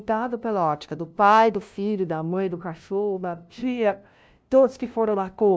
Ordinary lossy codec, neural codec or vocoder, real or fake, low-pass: none; codec, 16 kHz, 0.5 kbps, FunCodec, trained on LibriTTS, 25 frames a second; fake; none